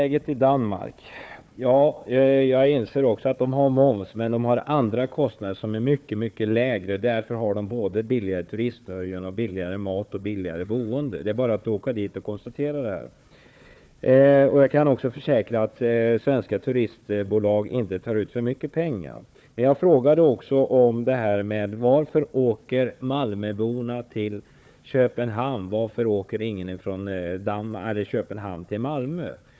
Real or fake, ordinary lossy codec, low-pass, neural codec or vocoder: fake; none; none; codec, 16 kHz, 4 kbps, FunCodec, trained on Chinese and English, 50 frames a second